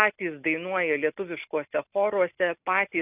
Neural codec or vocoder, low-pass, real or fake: none; 3.6 kHz; real